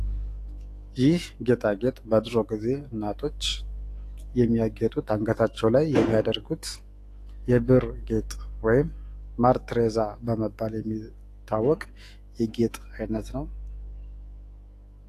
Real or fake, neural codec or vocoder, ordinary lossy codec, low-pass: fake; autoencoder, 48 kHz, 128 numbers a frame, DAC-VAE, trained on Japanese speech; AAC, 48 kbps; 14.4 kHz